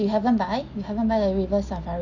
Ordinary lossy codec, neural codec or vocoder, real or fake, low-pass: none; none; real; 7.2 kHz